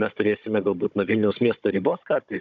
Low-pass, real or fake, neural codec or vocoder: 7.2 kHz; fake; codec, 16 kHz, 16 kbps, FunCodec, trained on Chinese and English, 50 frames a second